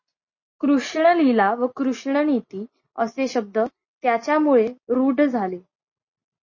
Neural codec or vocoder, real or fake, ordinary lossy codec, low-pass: none; real; MP3, 32 kbps; 7.2 kHz